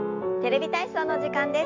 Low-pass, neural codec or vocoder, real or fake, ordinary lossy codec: 7.2 kHz; none; real; MP3, 64 kbps